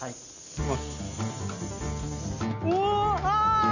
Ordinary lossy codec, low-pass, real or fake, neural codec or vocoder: none; 7.2 kHz; real; none